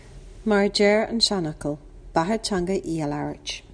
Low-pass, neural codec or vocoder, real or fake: 9.9 kHz; none; real